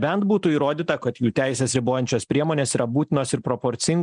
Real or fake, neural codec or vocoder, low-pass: real; none; 9.9 kHz